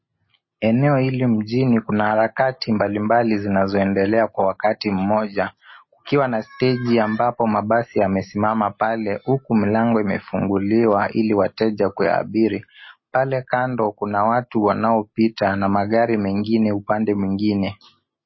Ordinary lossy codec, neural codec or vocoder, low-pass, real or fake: MP3, 24 kbps; none; 7.2 kHz; real